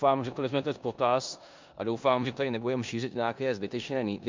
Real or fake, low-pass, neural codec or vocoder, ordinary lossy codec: fake; 7.2 kHz; codec, 16 kHz in and 24 kHz out, 0.9 kbps, LongCat-Audio-Codec, four codebook decoder; MP3, 64 kbps